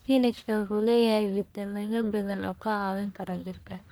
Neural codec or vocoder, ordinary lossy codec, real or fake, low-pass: codec, 44.1 kHz, 1.7 kbps, Pupu-Codec; none; fake; none